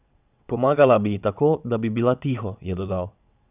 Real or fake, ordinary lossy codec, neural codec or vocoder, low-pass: fake; none; vocoder, 22.05 kHz, 80 mel bands, WaveNeXt; 3.6 kHz